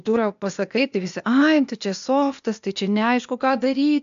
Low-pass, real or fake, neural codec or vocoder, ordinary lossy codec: 7.2 kHz; fake; codec, 16 kHz, 0.8 kbps, ZipCodec; MP3, 64 kbps